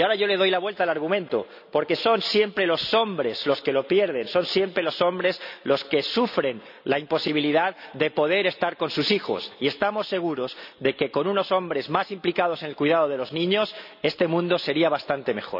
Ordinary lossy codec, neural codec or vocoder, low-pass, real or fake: none; none; 5.4 kHz; real